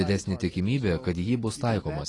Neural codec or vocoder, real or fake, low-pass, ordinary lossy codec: none; real; 10.8 kHz; AAC, 32 kbps